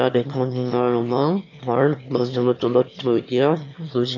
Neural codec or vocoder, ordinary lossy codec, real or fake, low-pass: autoencoder, 22.05 kHz, a latent of 192 numbers a frame, VITS, trained on one speaker; none; fake; 7.2 kHz